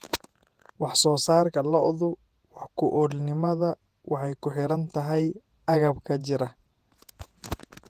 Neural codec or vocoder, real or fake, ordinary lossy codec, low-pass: vocoder, 48 kHz, 128 mel bands, Vocos; fake; Opus, 24 kbps; 14.4 kHz